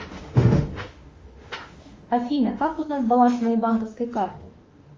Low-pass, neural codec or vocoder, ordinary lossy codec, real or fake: 7.2 kHz; autoencoder, 48 kHz, 32 numbers a frame, DAC-VAE, trained on Japanese speech; Opus, 32 kbps; fake